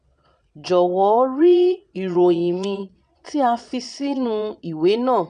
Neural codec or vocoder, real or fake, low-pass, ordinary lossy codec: vocoder, 22.05 kHz, 80 mel bands, Vocos; fake; 9.9 kHz; none